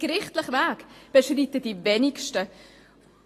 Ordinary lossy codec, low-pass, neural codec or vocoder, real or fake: AAC, 48 kbps; 14.4 kHz; none; real